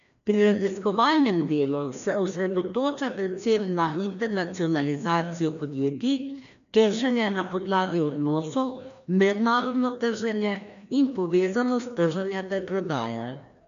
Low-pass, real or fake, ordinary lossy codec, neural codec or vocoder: 7.2 kHz; fake; none; codec, 16 kHz, 1 kbps, FreqCodec, larger model